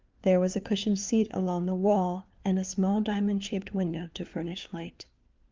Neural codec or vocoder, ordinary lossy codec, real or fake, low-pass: codec, 16 kHz, 4 kbps, FunCodec, trained on LibriTTS, 50 frames a second; Opus, 24 kbps; fake; 7.2 kHz